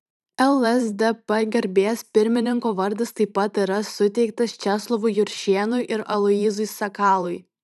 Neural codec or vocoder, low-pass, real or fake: vocoder, 44.1 kHz, 128 mel bands every 512 samples, BigVGAN v2; 14.4 kHz; fake